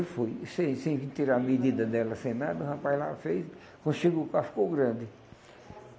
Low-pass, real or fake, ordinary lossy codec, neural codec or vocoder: none; real; none; none